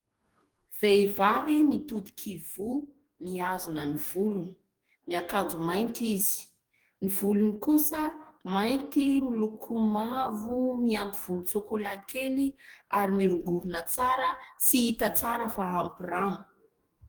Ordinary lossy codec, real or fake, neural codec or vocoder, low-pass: Opus, 32 kbps; fake; codec, 44.1 kHz, 2.6 kbps, DAC; 19.8 kHz